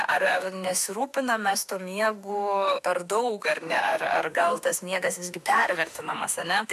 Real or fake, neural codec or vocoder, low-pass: fake; autoencoder, 48 kHz, 32 numbers a frame, DAC-VAE, trained on Japanese speech; 14.4 kHz